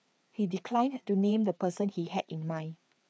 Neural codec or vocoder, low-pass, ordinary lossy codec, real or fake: codec, 16 kHz, 4 kbps, FreqCodec, larger model; none; none; fake